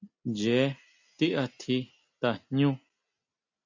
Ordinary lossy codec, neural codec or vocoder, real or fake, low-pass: MP3, 64 kbps; none; real; 7.2 kHz